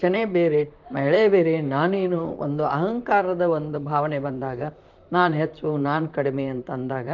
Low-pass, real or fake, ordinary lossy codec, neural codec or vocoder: 7.2 kHz; fake; Opus, 24 kbps; vocoder, 44.1 kHz, 128 mel bands, Pupu-Vocoder